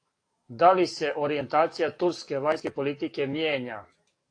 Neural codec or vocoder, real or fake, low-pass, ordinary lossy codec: codec, 44.1 kHz, 7.8 kbps, DAC; fake; 9.9 kHz; AAC, 48 kbps